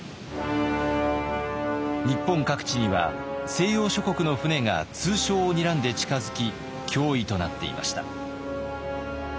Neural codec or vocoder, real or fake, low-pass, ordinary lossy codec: none; real; none; none